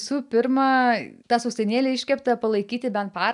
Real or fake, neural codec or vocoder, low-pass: real; none; 10.8 kHz